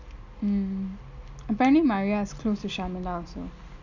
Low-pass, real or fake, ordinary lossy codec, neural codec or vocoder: 7.2 kHz; real; none; none